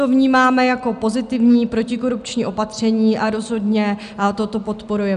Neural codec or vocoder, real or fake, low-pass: none; real; 10.8 kHz